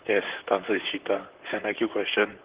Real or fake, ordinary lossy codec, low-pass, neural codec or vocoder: fake; Opus, 16 kbps; 3.6 kHz; vocoder, 44.1 kHz, 128 mel bands, Pupu-Vocoder